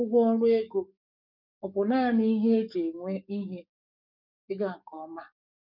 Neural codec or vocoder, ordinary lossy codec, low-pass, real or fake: codec, 44.1 kHz, 7.8 kbps, DAC; none; 5.4 kHz; fake